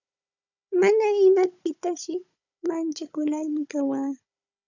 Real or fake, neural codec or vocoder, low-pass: fake; codec, 16 kHz, 16 kbps, FunCodec, trained on Chinese and English, 50 frames a second; 7.2 kHz